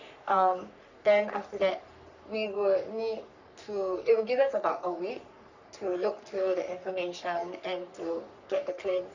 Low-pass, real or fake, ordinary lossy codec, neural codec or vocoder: 7.2 kHz; fake; none; codec, 44.1 kHz, 3.4 kbps, Pupu-Codec